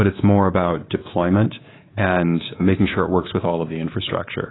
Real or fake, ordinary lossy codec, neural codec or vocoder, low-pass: fake; AAC, 16 kbps; codec, 24 kHz, 3.1 kbps, DualCodec; 7.2 kHz